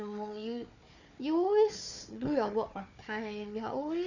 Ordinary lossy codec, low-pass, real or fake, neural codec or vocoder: none; 7.2 kHz; fake; codec, 16 kHz, 4 kbps, FunCodec, trained on Chinese and English, 50 frames a second